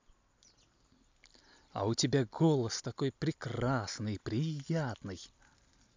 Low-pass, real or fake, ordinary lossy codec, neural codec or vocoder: 7.2 kHz; real; MP3, 64 kbps; none